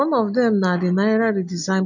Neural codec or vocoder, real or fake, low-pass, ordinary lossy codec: none; real; 7.2 kHz; none